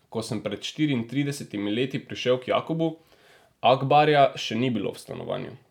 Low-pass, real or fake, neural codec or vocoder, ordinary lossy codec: 19.8 kHz; real; none; none